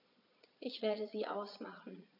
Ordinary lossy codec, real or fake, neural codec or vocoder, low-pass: none; fake; vocoder, 22.05 kHz, 80 mel bands, Vocos; 5.4 kHz